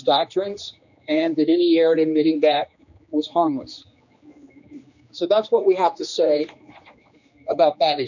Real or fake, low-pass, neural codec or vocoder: fake; 7.2 kHz; codec, 16 kHz, 2 kbps, X-Codec, HuBERT features, trained on general audio